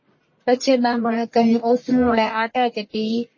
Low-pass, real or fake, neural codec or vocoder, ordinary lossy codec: 7.2 kHz; fake; codec, 44.1 kHz, 1.7 kbps, Pupu-Codec; MP3, 32 kbps